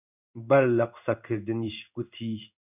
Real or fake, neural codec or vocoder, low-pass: fake; codec, 16 kHz in and 24 kHz out, 1 kbps, XY-Tokenizer; 3.6 kHz